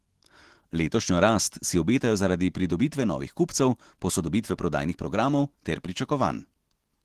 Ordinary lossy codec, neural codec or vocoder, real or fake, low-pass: Opus, 16 kbps; autoencoder, 48 kHz, 128 numbers a frame, DAC-VAE, trained on Japanese speech; fake; 14.4 kHz